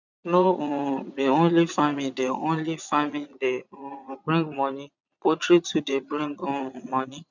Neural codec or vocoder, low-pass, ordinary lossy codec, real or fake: vocoder, 22.05 kHz, 80 mel bands, Vocos; 7.2 kHz; none; fake